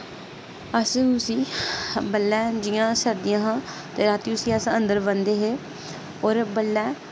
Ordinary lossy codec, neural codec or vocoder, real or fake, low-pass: none; none; real; none